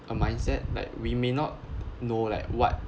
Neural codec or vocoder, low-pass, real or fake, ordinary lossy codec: none; none; real; none